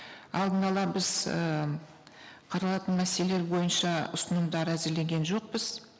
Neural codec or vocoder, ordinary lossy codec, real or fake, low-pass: none; none; real; none